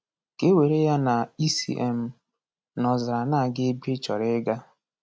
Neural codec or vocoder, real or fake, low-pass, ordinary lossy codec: none; real; none; none